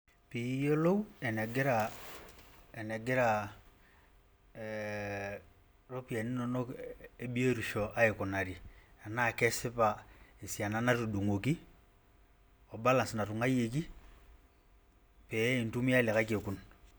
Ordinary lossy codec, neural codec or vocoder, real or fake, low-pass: none; none; real; none